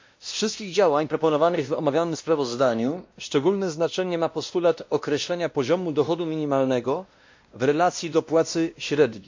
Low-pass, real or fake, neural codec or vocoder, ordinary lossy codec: 7.2 kHz; fake; codec, 16 kHz, 1 kbps, X-Codec, WavLM features, trained on Multilingual LibriSpeech; MP3, 48 kbps